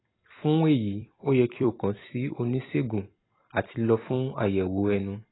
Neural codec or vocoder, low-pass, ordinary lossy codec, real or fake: vocoder, 44.1 kHz, 128 mel bands every 256 samples, BigVGAN v2; 7.2 kHz; AAC, 16 kbps; fake